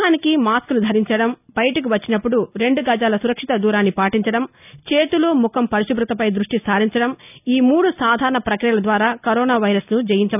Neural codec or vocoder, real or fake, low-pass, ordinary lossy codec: none; real; 3.6 kHz; none